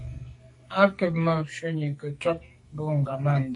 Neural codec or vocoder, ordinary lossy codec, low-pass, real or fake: codec, 44.1 kHz, 2.6 kbps, SNAC; MP3, 48 kbps; 10.8 kHz; fake